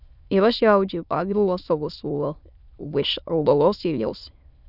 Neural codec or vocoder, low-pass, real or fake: autoencoder, 22.05 kHz, a latent of 192 numbers a frame, VITS, trained on many speakers; 5.4 kHz; fake